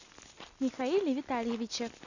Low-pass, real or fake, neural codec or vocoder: 7.2 kHz; real; none